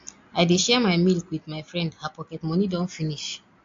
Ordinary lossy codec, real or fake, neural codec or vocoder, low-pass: AAC, 48 kbps; real; none; 7.2 kHz